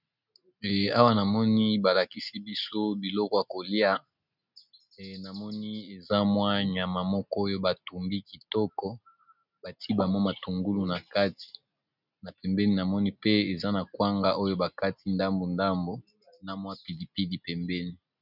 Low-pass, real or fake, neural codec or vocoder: 5.4 kHz; real; none